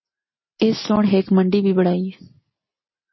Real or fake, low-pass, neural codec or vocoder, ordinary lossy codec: real; 7.2 kHz; none; MP3, 24 kbps